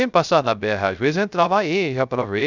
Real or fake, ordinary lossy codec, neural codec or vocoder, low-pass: fake; none; codec, 16 kHz, 0.3 kbps, FocalCodec; 7.2 kHz